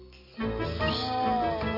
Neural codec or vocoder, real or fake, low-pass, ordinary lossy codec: none; real; 5.4 kHz; none